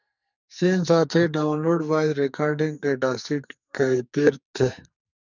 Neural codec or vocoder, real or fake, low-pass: codec, 44.1 kHz, 2.6 kbps, SNAC; fake; 7.2 kHz